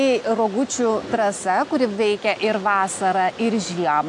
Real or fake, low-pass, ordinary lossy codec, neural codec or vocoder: real; 10.8 kHz; AAC, 64 kbps; none